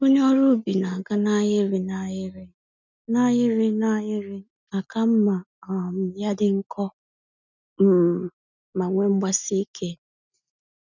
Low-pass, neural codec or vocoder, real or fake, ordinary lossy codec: 7.2 kHz; none; real; none